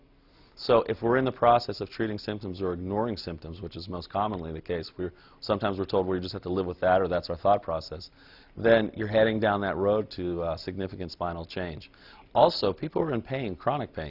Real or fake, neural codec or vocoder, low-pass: real; none; 5.4 kHz